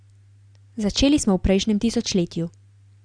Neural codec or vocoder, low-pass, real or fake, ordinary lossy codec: none; 9.9 kHz; real; Opus, 64 kbps